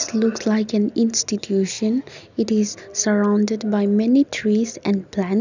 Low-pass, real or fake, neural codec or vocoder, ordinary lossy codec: 7.2 kHz; real; none; none